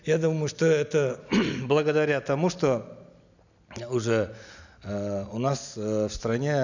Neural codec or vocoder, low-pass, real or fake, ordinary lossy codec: none; 7.2 kHz; real; none